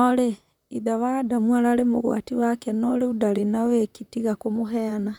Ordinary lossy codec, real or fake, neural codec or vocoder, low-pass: none; fake; vocoder, 44.1 kHz, 128 mel bands, Pupu-Vocoder; 19.8 kHz